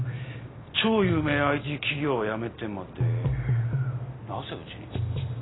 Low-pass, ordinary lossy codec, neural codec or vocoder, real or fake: 7.2 kHz; AAC, 16 kbps; none; real